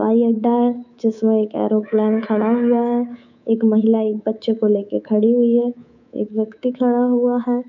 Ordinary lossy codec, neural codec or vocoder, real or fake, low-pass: none; codec, 24 kHz, 3.1 kbps, DualCodec; fake; 7.2 kHz